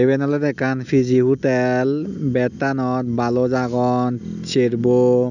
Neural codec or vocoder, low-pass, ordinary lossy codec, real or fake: none; 7.2 kHz; none; real